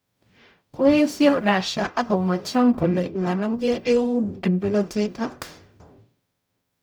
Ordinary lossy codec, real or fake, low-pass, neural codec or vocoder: none; fake; none; codec, 44.1 kHz, 0.9 kbps, DAC